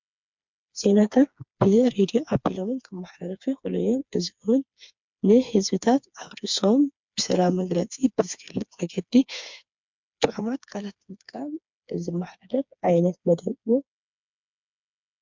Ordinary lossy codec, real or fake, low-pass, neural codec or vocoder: MP3, 64 kbps; fake; 7.2 kHz; codec, 16 kHz, 4 kbps, FreqCodec, smaller model